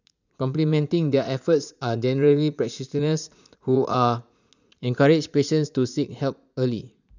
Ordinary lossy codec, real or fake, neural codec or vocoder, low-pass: none; fake; vocoder, 44.1 kHz, 80 mel bands, Vocos; 7.2 kHz